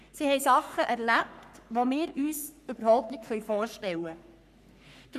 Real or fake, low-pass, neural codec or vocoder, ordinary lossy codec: fake; 14.4 kHz; codec, 44.1 kHz, 3.4 kbps, Pupu-Codec; none